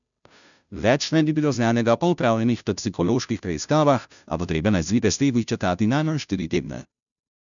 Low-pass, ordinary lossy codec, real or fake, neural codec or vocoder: 7.2 kHz; none; fake; codec, 16 kHz, 0.5 kbps, FunCodec, trained on Chinese and English, 25 frames a second